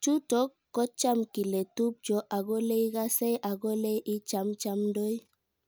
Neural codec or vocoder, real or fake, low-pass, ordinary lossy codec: none; real; none; none